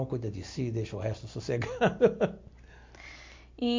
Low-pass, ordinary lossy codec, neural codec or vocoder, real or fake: 7.2 kHz; MP3, 48 kbps; none; real